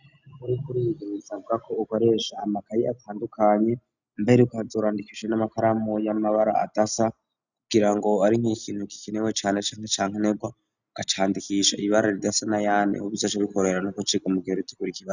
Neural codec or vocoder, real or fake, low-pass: none; real; 7.2 kHz